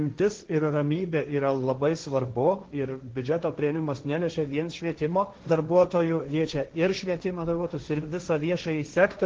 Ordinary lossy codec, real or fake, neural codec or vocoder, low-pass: Opus, 16 kbps; fake; codec, 16 kHz, 1.1 kbps, Voila-Tokenizer; 7.2 kHz